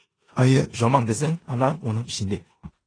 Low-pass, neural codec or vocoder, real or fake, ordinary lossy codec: 9.9 kHz; codec, 16 kHz in and 24 kHz out, 0.9 kbps, LongCat-Audio-Codec, four codebook decoder; fake; AAC, 32 kbps